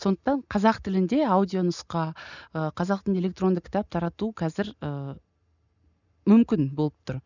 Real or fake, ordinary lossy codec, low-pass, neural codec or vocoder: real; none; 7.2 kHz; none